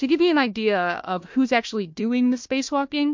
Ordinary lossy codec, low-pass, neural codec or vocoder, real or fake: MP3, 48 kbps; 7.2 kHz; codec, 16 kHz, 1 kbps, FunCodec, trained on Chinese and English, 50 frames a second; fake